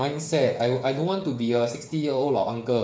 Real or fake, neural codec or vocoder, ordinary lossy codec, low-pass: fake; codec, 16 kHz, 8 kbps, FreqCodec, smaller model; none; none